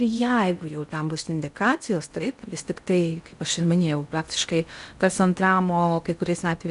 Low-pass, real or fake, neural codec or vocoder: 10.8 kHz; fake; codec, 16 kHz in and 24 kHz out, 0.6 kbps, FocalCodec, streaming, 4096 codes